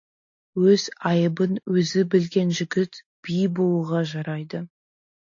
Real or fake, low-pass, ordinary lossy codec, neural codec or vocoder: real; 7.2 kHz; AAC, 48 kbps; none